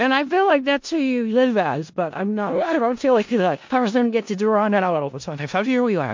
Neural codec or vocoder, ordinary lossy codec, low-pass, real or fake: codec, 16 kHz in and 24 kHz out, 0.4 kbps, LongCat-Audio-Codec, four codebook decoder; MP3, 48 kbps; 7.2 kHz; fake